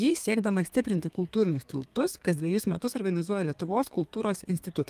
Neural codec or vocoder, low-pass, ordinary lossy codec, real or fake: codec, 32 kHz, 1.9 kbps, SNAC; 14.4 kHz; Opus, 32 kbps; fake